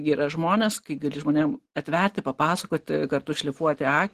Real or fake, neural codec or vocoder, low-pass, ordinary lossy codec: real; none; 14.4 kHz; Opus, 16 kbps